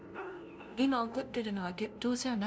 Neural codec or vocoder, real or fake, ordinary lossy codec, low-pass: codec, 16 kHz, 0.5 kbps, FunCodec, trained on LibriTTS, 25 frames a second; fake; none; none